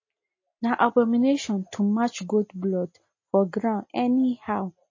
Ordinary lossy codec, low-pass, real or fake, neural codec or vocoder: MP3, 32 kbps; 7.2 kHz; real; none